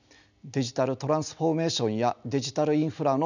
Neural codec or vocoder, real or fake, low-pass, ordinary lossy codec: none; real; 7.2 kHz; none